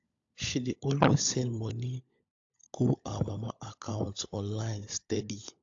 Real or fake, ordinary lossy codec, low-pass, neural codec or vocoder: fake; AAC, 48 kbps; 7.2 kHz; codec, 16 kHz, 16 kbps, FunCodec, trained on LibriTTS, 50 frames a second